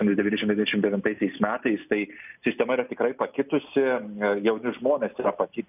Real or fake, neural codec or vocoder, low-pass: real; none; 3.6 kHz